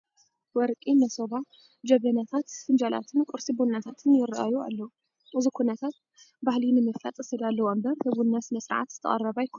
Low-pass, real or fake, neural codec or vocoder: 7.2 kHz; real; none